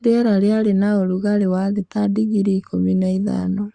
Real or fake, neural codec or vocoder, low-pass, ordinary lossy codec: fake; codec, 44.1 kHz, 7.8 kbps, Pupu-Codec; 9.9 kHz; Opus, 64 kbps